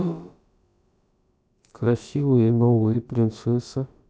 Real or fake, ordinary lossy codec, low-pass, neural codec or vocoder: fake; none; none; codec, 16 kHz, about 1 kbps, DyCAST, with the encoder's durations